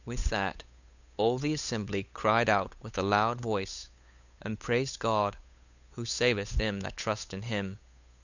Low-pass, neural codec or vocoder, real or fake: 7.2 kHz; codec, 16 kHz, 8 kbps, FunCodec, trained on Chinese and English, 25 frames a second; fake